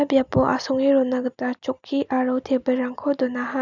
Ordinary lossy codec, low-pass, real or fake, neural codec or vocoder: none; 7.2 kHz; real; none